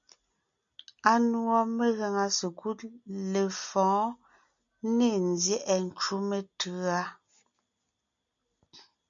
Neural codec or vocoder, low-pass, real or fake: none; 7.2 kHz; real